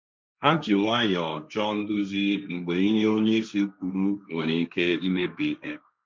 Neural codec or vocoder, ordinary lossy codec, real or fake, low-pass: codec, 16 kHz, 1.1 kbps, Voila-Tokenizer; none; fake; none